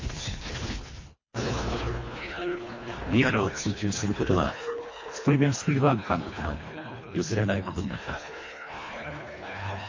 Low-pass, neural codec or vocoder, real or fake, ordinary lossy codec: 7.2 kHz; codec, 24 kHz, 1.5 kbps, HILCodec; fake; MP3, 32 kbps